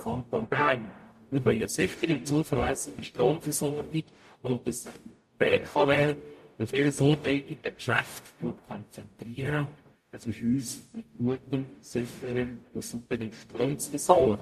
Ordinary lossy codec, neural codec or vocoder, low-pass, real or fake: MP3, 64 kbps; codec, 44.1 kHz, 0.9 kbps, DAC; 14.4 kHz; fake